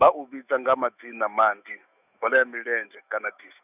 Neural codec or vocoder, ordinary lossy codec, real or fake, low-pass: autoencoder, 48 kHz, 128 numbers a frame, DAC-VAE, trained on Japanese speech; none; fake; 3.6 kHz